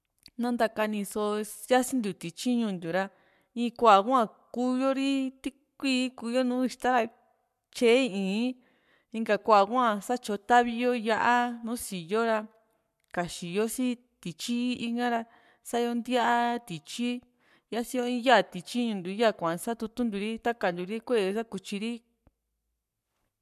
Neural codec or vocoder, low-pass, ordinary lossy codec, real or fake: codec, 44.1 kHz, 7.8 kbps, Pupu-Codec; 14.4 kHz; MP3, 96 kbps; fake